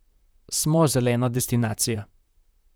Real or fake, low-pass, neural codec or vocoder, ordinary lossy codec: fake; none; vocoder, 44.1 kHz, 128 mel bands, Pupu-Vocoder; none